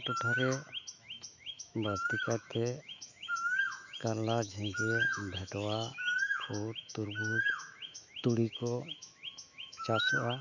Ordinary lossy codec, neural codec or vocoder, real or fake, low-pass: none; autoencoder, 48 kHz, 128 numbers a frame, DAC-VAE, trained on Japanese speech; fake; 7.2 kHz